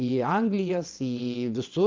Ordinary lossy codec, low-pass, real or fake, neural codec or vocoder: Opus, 32 kbps; 7.2 kHz; fake; vocoder, 22.05 kHz, 80 mel bands, WaveNeXt